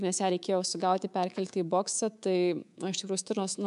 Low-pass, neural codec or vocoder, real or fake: 10.8 kHz; codec, 24 kHz, 3.1 kbps, DualCodec; fake